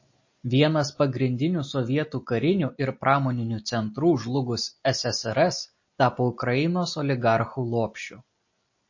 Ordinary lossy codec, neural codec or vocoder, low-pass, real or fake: MP3, 32 kbps; none; 7.2 kHz; real